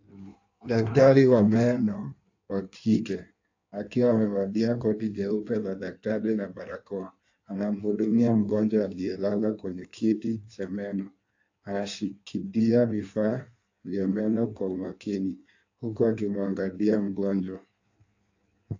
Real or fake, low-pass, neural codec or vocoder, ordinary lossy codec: fake; 7.2 kHz; codec, 16 kHz in and 24 kHz out, 1.1 kbps, FireRedTTS-2 codec; AAC, 48 kbps